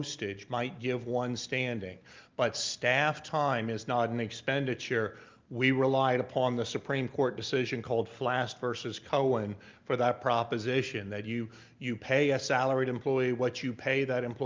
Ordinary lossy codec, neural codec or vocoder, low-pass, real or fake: Opus, 24 kbps; none; 7.2 kHz; real